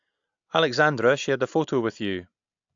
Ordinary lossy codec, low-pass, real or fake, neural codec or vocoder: AAC, 64 kbps; 7.2 kHz; real; none